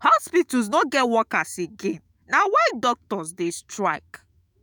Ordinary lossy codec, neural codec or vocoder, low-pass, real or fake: none; autoencoder, 48 kHz, 128 numbers a frame, DAC-VAE, trained on Japanese speech; none; fake